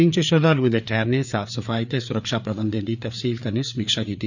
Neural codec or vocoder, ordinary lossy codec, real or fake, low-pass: codec, 16 kHz, 4 kbps, FreqCodec, larger model; none; fake; 7.2 kHz